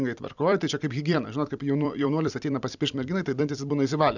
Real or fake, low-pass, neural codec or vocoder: fake; 7.2 kHz; vocoder, 44.1 kHz, 128 mel bands every 256 samples, BigVGAN v2